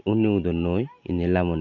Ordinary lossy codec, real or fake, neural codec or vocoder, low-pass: none; real; none; 7.2 kHz